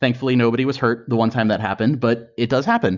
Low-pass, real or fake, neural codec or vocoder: 7.2 kHz; real; none